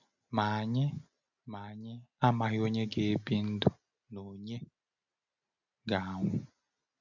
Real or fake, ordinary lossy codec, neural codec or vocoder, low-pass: real; none; none; 7.2 kHz